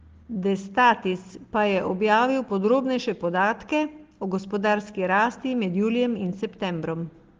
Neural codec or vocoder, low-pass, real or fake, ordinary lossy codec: none; 7.2 kHz; real; Opus, 16 kbps